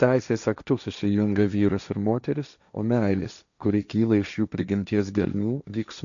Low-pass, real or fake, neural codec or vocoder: 7.2 kHz; fake; codec, 16 kHz, 1.1 kbps, Voila-Tokenizer